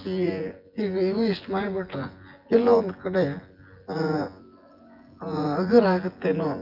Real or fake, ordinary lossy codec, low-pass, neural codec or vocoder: fake; Opus, 32 kbps; 5.4 kHz; vocoder, 24 kHz, 100 mel bands, Vocos